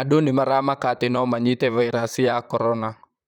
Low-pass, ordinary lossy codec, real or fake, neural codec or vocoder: 19.8 kHz; none; fake; vocoder, 44.1 kHz, 128 mel bands, Pupu-Vocoder